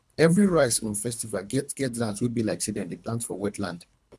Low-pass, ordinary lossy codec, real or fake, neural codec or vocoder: none; none; fake; codec, 24 kHz, 3 kbps, HILCodec